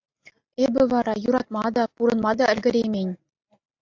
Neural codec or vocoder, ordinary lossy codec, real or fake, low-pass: none; AAC, 48 kbps; real; 7.2 kHz